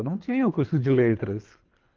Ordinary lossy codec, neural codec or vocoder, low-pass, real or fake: Opus, 32 kbps; codec, 24 kHz, 3 kbps, HILCodec; 7.2 kHz; fake